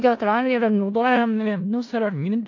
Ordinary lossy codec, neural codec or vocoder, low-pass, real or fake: AAC, 48 kbps; codec, 16 kHz in and 24 kHz out, 0.4 kbps, LongCat-Audio-Codec, four codebook decoder; 7.2 kHz; fake